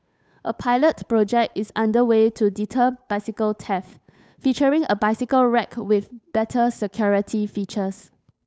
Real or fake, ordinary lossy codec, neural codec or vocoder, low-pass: fake; none; codec, 16 kHz, 8 kbps, FunCodec, trained on Chinese and English, 25 frames a second; none